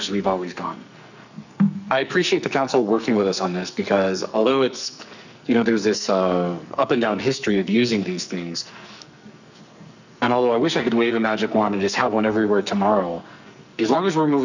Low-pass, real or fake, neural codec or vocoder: 7.2 kHz; fake; codec, 44.1 kHz, 2.6 kbps, SNAC